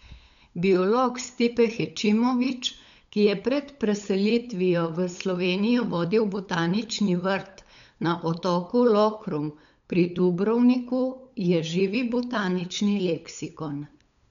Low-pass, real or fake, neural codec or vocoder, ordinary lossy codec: 7.2 kHz; fake; codec, 16 kHz, 8 kbps, FunCodec, trained on LibriTTS, 25 frames a second; none